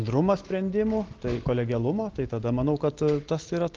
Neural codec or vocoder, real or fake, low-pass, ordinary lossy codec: none; real; 7.2 kHz; Opus, 16 kbps